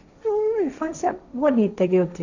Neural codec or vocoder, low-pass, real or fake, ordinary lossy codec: codec, 16 kHz, 1.1 kbps, Voila-Tokenizer; 7.2 kHz; fake; none